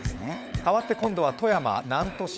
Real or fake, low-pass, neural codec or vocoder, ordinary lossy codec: fake; none; codec, 16 kHz, 16 kbps, FunCodec, trained on LibriTTS, 50 frames a second; none